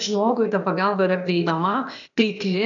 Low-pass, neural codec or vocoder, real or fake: 7.2 kHz; codec, 16 kHz, 0.8 kbps, ZipCodec; fake